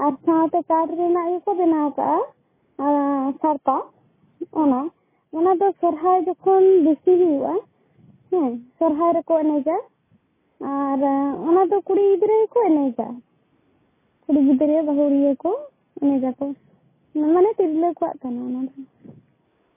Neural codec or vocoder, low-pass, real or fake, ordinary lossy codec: none; 3.6 kHz; real; MP3, 16 kbps